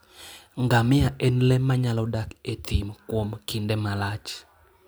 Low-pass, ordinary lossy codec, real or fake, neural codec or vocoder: none; none; real; none